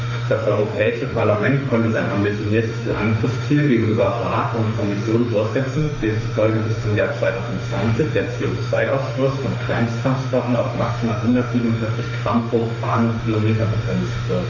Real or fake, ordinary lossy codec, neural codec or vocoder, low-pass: fake; none; autoencoder, 48 kHz, 32 numbers a frame, DAC-VAE, trained on Japanese speech; 7.2 kHz